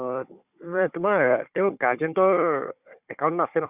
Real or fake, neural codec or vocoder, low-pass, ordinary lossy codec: fake; codec, 16 kHz, 4 kbps, FunCodec, trained on Chinese and English, 50 frames a second; 3.6 kHz; Opus, 64 kbps